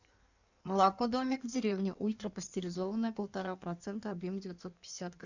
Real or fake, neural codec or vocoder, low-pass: fake; codec, 16 kHz in and 24 kHz out, 1.1 kbps, FireRedTTS-2 codec; 7.2 kHz